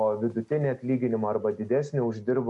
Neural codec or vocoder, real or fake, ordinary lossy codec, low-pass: none; real; MP3, 64 kbps; 10.8 kHz